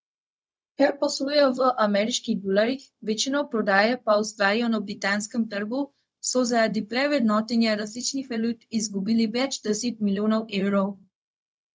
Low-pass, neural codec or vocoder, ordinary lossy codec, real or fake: none; codec, 16 kHz, 0.4 kbps, LongCat-Audio-Codec; none; fake